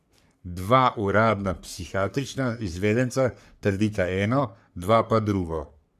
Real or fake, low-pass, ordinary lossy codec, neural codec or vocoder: fake; 14.4 kHz; none; codec, 44.1 kHz, 3.4 kbps, Pupu-Codec